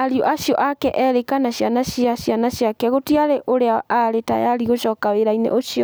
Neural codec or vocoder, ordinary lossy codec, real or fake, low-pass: none; none; real; none